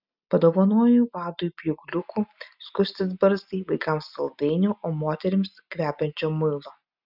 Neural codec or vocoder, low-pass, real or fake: none; 5.4 kHz; real